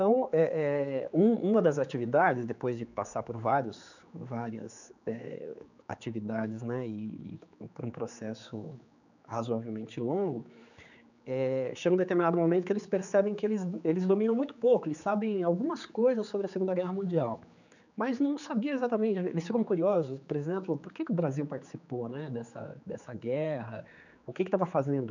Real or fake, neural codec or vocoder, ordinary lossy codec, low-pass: fake; codec, 16 kHz, 4 kbps, X-Codec, HuBERT features, trained on general audio; none; 7.2 kHz